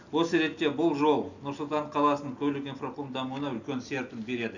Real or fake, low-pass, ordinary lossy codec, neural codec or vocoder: real; 7.2 kHz; none; none